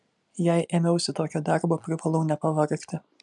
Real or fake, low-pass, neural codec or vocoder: real; 10.8 kHz; none